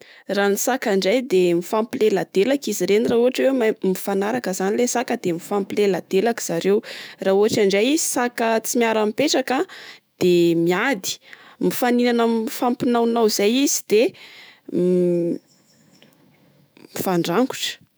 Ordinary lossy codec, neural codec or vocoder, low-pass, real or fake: none; autoencoder, 48 kHz, 128 numbers a frame, DAC-VAE, trained on Japanese speech; none; fake